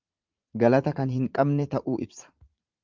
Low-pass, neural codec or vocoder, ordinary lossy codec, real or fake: 7.2 kHz; vocoder, 44.1 kHz, 80 mel bands, Vocos; Opus, 24 kbps; fake